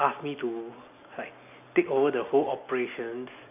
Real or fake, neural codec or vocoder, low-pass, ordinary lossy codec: real; none; 3.6 kHz; none